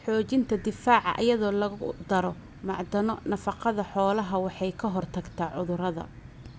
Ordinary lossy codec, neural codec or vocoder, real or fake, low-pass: none; none; real; none